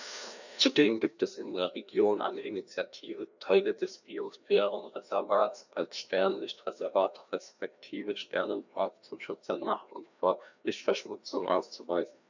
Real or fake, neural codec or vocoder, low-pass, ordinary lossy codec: fake; codec, 16 kHz, 1 kbps, FreqCodec, larger model; 7.2 kHz; none